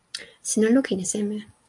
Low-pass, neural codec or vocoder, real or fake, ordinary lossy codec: 10.8 kHz; none; real; MP3, 64 kbps